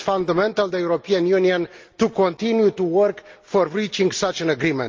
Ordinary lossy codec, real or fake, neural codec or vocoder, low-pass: Opus, 24 kbps; real; none; 7.2 kHz